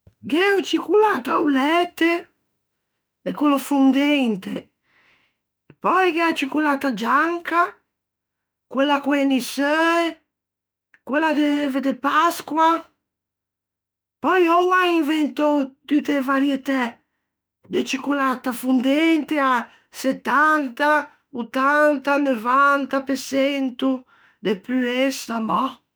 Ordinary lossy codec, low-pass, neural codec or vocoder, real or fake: none; none; autoencoder, 48 kHz, 32 numbers a frame, DAC-VAE, trained on Japanese speech; fake